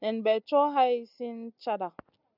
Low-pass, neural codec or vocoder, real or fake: 5.4 kHz; none; real